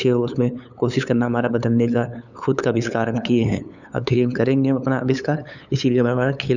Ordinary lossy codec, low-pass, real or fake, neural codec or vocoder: none; 7.2 kHz; fake; codec, 16 kHz, 8 kbps, FunCodec, trained on LibriTTS, 25 frames a second